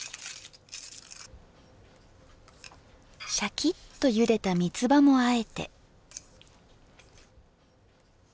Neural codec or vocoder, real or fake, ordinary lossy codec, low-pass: none; real; none; none